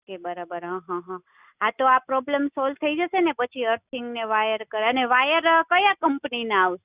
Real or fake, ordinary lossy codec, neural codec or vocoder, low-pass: real; Opus, 64 kbps; none; 3.6 kHz